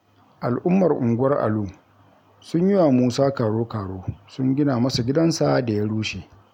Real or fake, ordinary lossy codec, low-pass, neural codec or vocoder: real; none; 19.8 kHz; none